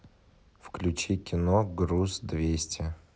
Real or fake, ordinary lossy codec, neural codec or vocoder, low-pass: real; none; none; none